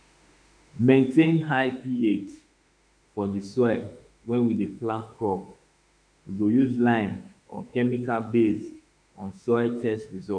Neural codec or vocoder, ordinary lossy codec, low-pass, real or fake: autoencoder, 48 kHz, 32 numbers a frame, DAC-VAE, trained on Japanese speech; none; 9.9 kHz; fake